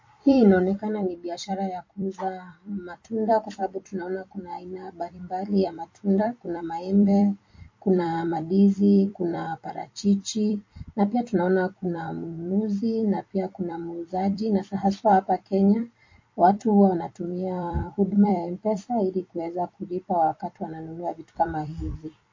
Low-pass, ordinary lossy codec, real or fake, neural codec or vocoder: 7.2 kHz; MP3, 32 kbps; fake; vocoder, 44.1 kHz, 128 mel bands every 256 samples, BigVGAN v2